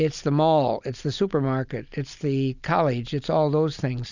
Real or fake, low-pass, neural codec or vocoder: real; 7.2 kHz; none